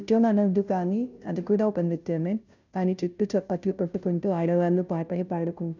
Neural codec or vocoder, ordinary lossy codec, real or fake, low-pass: codec, 16 kHz, 0.5 kbps, FunCodec, trained on Chinese and English, 25 frames a second; none; fake; 7.2 kHz